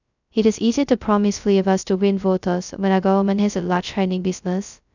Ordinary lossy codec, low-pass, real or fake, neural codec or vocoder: none; 7.2 kHz; fake; codec, 16 kHz, 0.2 kbps, FocalCodec